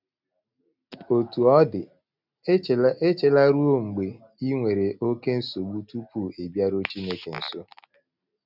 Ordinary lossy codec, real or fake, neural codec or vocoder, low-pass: none; real; none; 5.4 kHz